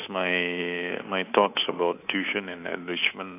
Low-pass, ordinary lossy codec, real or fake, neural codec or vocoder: 3.6 kHz; AAC, 24 kbps; fake; codec, 24 kHz, 3.1 kbps, DualCodec